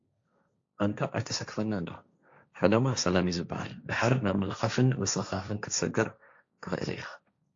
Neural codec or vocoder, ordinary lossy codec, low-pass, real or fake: codec, 16 kHz, 1.1 kbps, Voila-Tokenizer; AAC, 48 kbps; 7.2 kHz; fake